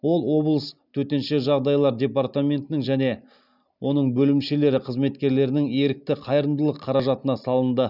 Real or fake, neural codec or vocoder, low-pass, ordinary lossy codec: real; none; 5.4 kHz; none